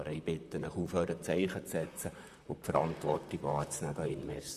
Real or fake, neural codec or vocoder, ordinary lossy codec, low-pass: fake; vocoder, 44.1 kHz, 128 mel bands, Pupu-Vocoder; Opus, 64 kbps; 14.4 kHz